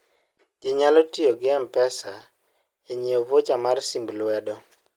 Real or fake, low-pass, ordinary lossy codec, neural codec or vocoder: real; 19.8 kHz; Opus, 24 kbps; none